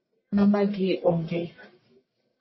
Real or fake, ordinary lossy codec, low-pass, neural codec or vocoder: fake; MP3, 24 kbps; 7.2 kHz; codec, 44.1 kHz, 1.7 kbps, Pupu-Codec